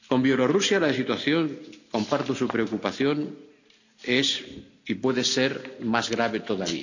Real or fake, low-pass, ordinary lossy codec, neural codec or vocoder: real; 7.2 kHz; none; none